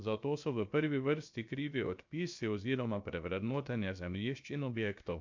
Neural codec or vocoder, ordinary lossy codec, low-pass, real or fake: codec, 16 kHz, 0.7 kbps, FocalCodec; MP3, 64 kbps; 7.2 kHz; fake